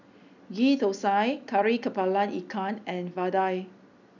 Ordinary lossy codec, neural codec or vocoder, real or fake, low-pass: none; none; real; 7.2 kHz